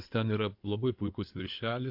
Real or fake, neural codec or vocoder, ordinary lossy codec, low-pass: fake; codec, 16 kHz in and 24 kHz out, 2.2 kbps, FireRedTTS-2 codec; MP3, 48 kbps; 5.4 kHz